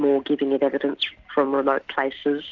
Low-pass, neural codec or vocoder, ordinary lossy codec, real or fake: 7.2 kHz; none; Opus, 64 kbps; real